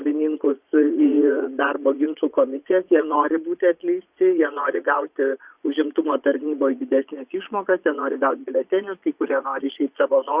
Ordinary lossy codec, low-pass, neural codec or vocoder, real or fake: Opus, 64 kbps; 3.6 kHz; vocoder, 22.05 kHz, 80 mel bands, Vocos; fake